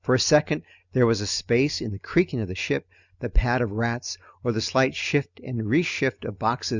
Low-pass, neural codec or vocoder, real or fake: 7.2 kHz; none; real